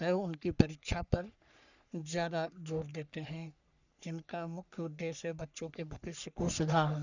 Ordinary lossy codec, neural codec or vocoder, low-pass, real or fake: none; codec, 44.1 kHz, 3.4 kbps, Pupu-Codec; 7.2 kHz; fake